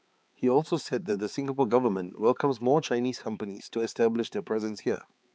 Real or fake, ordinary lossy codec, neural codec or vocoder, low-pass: fake; none; codec, 16 kHz, 4 kbps, X-Codec, HuBERT features, trained on balanced general audio; none